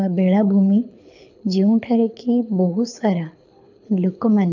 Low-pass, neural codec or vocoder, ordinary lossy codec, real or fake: 7.2 kHz; codec, 24 kHz, 6 kbps, HILCodec; none; fake